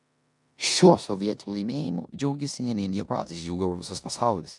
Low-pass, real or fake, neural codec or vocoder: 10.8 kHz; fake; codec, 16 kHz in and 24 kHz out, 0.9 kbps, LongCat-Audio-Codec, four codebook decoder